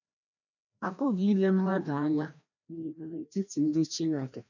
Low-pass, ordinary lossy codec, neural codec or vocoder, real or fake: 7.2 kHz; none; codec, 16 kHz, 1 kbps, FreqCodec, larger model; fake